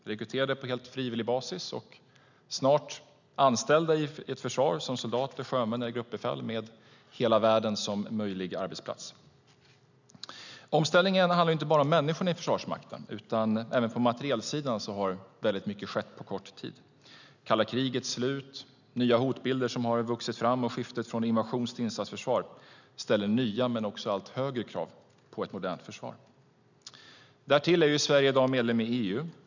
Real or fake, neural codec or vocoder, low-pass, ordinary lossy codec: real; none; 7.2 kHz; none